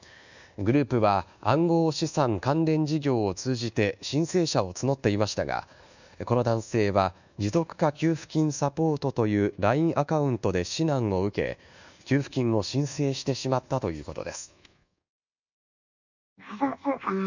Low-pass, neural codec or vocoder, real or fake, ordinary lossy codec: 7.2 kHz; codec, 24 kHz, 1.2 kbps, DualCodec; fake; none